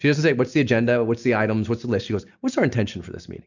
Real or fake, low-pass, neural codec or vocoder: real; 7.2 kHz; none